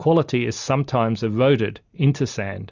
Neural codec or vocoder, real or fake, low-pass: none; real; 7.2 kHz